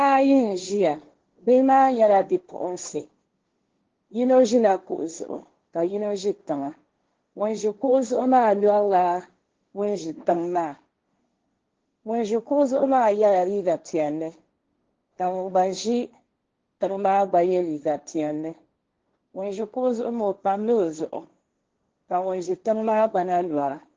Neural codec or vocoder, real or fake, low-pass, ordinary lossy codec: codec, 16 kHz, 1.1 kbps, Voila-Tokenizer; fake; 7.2 kHz; Opus, 16 kbps